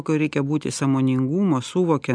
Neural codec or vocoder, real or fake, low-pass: none; real; 9.9 kHz